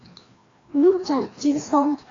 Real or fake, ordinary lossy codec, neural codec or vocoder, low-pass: fake; AAC, 32 kbps; codec, 16 kHz, 1 kbps, FreqCodec, larger model; 7.2 kHz